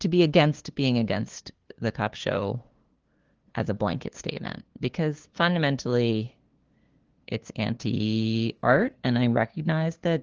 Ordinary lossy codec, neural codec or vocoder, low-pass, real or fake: Opus, 16 kbps; codec, 16 kHz, 2 kbps, FunCodec, trained on LibriTTS, 25 frames a second; 7.2 kHz; fake